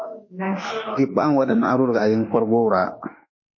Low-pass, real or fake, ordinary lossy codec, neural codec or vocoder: 7.2 kHz; fake; MP3, 32 kbps; autoencoder, 48 kHz, 32 numbers a frame, DAC-VAE, trained on Japanese speech